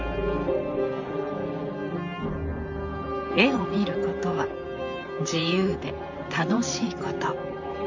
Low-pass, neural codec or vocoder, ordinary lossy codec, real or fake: 7.2 kHz; vocoder, 44.1 kHz, 128 mel bands, Pupu-Vocoder; MP3, 48 kbps; fake